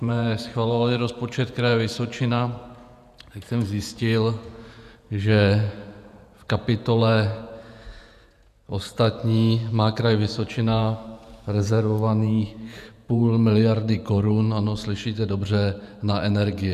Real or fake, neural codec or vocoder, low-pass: fake; vocoder, 48 kHz, 128 mel bands, Vocos; 14.4 kHz